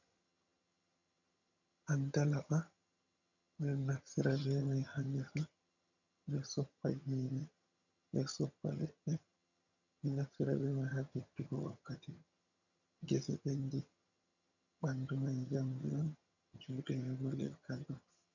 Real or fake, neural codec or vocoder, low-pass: fake; vocoder, 22.05 kHz, 80 mel bands, HiFi-GAN; 7.2 kHz